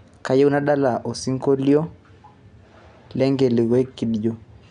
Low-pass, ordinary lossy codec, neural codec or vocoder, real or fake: 9.9 kHz; none; none; real